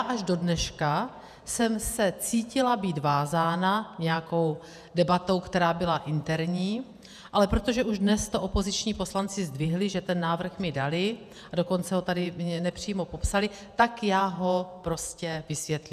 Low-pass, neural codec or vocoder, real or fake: 14.4 kHz; vocoder, 48 kHz, 128 mel bands, Vocos; fake